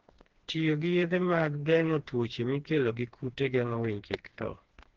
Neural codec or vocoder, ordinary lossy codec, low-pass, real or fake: codec, 16 kHz, 2 kbps, FreqCodec, smaller model; Opus, 16 kbps; 7.2 kHz; fake